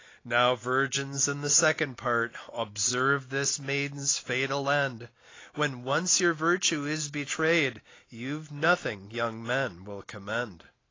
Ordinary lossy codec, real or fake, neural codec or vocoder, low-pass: AAC, 32 kbps; real; none; 7.2 kHz